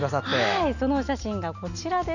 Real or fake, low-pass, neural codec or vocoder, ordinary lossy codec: real; 7.2 kHz; none; none